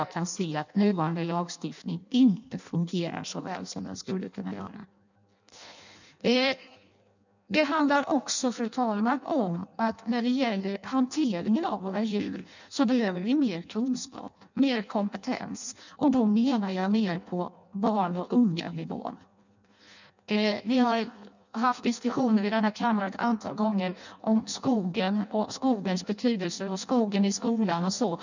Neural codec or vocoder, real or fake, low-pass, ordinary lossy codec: codec, 16 kHz in and 24 kHz out, 0.6 kbps, FireRedTTS-2 codec; fake; 7.2 kHz; none